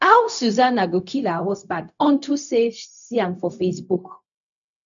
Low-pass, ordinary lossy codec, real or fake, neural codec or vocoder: 7.2 kHz; none; fake; codec, 16 kHz, 0.4 kbps, LongCat-Audio-Codec